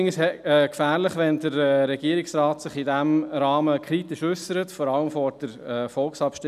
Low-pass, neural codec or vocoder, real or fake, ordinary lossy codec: 14.4 kHz; none; real; none